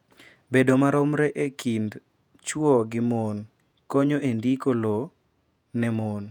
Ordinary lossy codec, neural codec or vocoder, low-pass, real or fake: none; vocoder, 48 kHz, 128 mel bands, Vocos; 19.8 kHz; fake